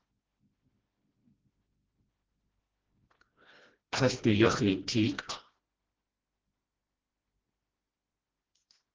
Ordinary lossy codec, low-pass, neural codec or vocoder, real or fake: Opus, 16 kbps; 7.2 kHz; codec, 16 kHz, 1 kbps, FreqCodec, smaller model; fake